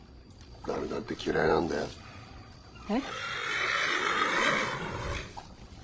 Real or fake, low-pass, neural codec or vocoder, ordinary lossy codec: fake; none; codec, 16 kHz, 16 kbps, FreqCodec, larger model; none